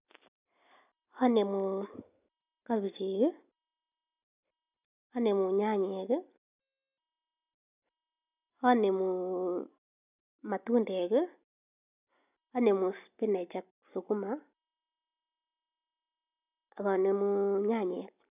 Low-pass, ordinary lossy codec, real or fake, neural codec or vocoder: 3.6 kHz; none; real; none